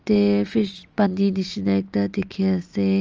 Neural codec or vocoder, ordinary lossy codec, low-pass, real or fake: none; Opus, 24 kbps; 7.2 kHz; real